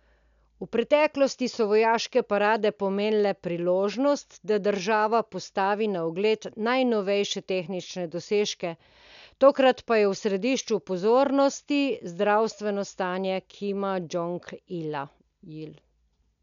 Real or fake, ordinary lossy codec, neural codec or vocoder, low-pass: real; none; none; 7.2 kHz